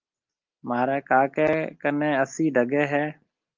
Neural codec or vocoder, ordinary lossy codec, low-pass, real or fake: none; Opus, 24 kbps; 7.2 kHz; real